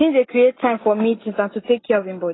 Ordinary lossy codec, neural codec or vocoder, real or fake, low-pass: AAC, 16 kbps; none; real; 7.2 kHz